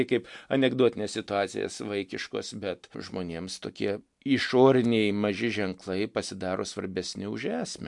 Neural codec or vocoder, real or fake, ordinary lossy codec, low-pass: none; real; MP3, 64 kbps; 10.8 kHz